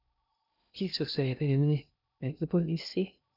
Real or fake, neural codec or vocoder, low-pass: fake; codec, 16 kHz in and 24 kHz out, 0.6 kbps, FocalCodec, streaming, 2048 codes; 5.4 kHz